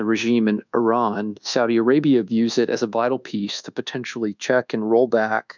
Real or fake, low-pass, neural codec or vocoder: fake; 7.2 kHz; codec, 24 kHz, 1.2 kbps, DualCodec